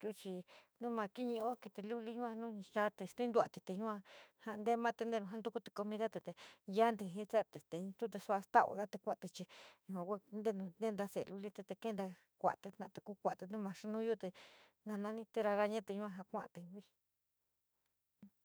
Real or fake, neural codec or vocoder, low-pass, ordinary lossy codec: fake; autoencoder, 48 kHz, 32 numbers a frame, DAC-VAE, trained on Japanese speech; none; none